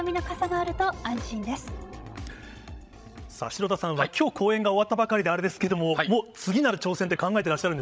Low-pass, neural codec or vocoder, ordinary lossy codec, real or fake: none; codec, 16 kHz, 16 kbps, FreqCodec, larger model; none; fake